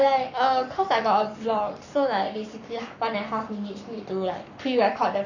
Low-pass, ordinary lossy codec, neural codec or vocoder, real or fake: 7.2 kHz; none; codec, 44.1 kHz, 7.8 kbps, Pupu-Codec; fake